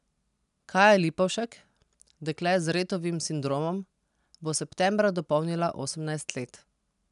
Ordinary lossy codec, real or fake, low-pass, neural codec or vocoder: none; real; 10.8 kHz; none